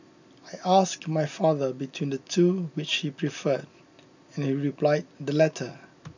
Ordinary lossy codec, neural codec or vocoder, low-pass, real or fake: none; none; 7.2 kHz; real